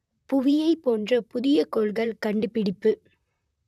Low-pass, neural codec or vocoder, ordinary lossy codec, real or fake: 14.4 kHz; vocoder, 44.1 kHz, 128 mel bands, Pupu-Vocoder; none; fake